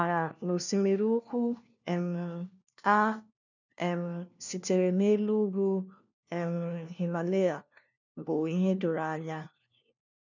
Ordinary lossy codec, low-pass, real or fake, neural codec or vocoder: none; 7.2 kHz; fake; codec, 16 kHz, 1 kbps, FunCodec, trained on LibriTTS, 50 frames a second